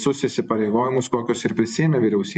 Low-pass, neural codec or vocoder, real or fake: 10.8 kHz; vocoder, 44.1 kHz, 128 mel bands every 512 samples, BigVGAN v2; fake